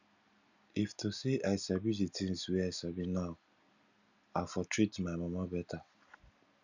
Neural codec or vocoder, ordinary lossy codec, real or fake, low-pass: none; none; real; 7.2 kHz